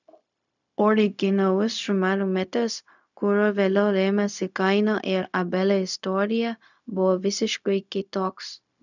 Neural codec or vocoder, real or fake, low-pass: codec, 16 kHz, 0.4 kbps, LongCat-Audio-Codec; fake; 7.2 kHz